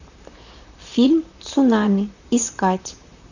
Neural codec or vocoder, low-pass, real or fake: vocoder, 44.1 kHz, 128 mel bands, Pupu-Vocoder; 7.2 kHz; fake